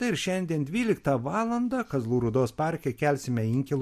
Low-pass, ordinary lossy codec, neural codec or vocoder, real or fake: 14.4 kHz; MP3, 64 kbps; none; real